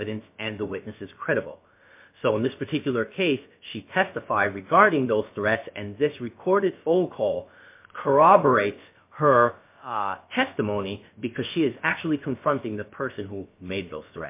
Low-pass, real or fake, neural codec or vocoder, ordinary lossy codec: 3.6 kHz; fake; codec, 16 kHz, about 1 kbps, DyCAST, with the encoder's durations; MP3, 24 kbps